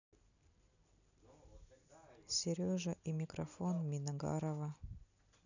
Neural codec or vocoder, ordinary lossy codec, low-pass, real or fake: none; none; 7.2 kHz; real